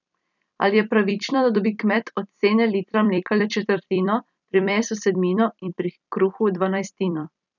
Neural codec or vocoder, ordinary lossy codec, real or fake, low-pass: vocoder, 44.1 kHz, 128 mel bands every 256 samples, BigVGAN v2; none; fake; 7.2 kHz